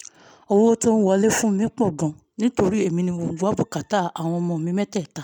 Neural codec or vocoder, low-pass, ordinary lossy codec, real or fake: none; 19.8 kHz; none; real